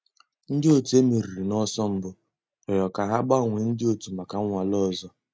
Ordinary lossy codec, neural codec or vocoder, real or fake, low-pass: none; none; real; none